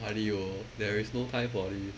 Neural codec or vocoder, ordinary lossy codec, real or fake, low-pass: none; none; real; none